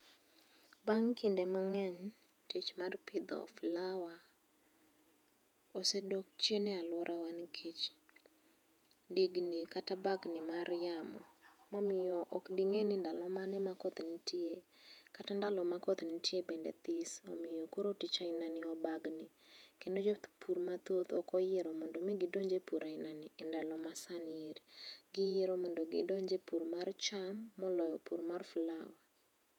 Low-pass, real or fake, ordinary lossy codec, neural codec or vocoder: 19.8 kHz; fake; none; vocoder, 48 kHz, 128 mel bands, Vocos